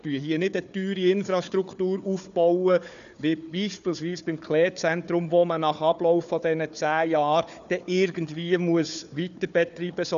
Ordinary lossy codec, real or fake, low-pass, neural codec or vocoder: none; fake; 7.2 kHz; codec, 16 kHz, 4 kbps, FunCodec, trained on Chinese and English, 50 frames a second